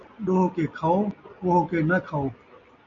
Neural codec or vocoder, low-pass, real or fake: none; 7.2 kHz; real